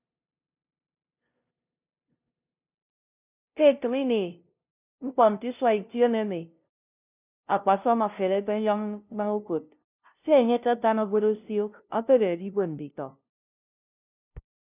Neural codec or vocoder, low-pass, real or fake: codec, 16 kHz, 0.5 kbps, FunCodec, trained on LibriTTS, 25 frames a second; 3.6 kHz; fake